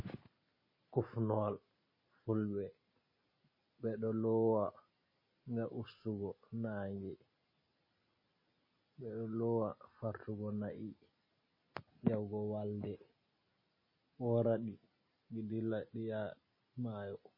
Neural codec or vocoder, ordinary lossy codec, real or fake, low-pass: none; MP3, 24 kbps; real; 5.4 kHz